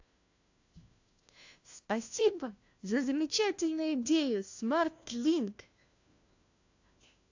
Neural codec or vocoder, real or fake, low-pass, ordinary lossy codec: codec, 16 kHz, 1 kbps, FunCodec, trained on LibriTTS, 50 frames a second; fake; 7.2 kHz; AAC, 48 kbps